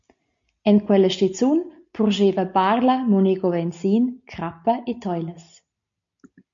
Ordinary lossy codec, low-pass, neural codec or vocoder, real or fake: MP3, 48 kbps; 7.2 kHz; none; real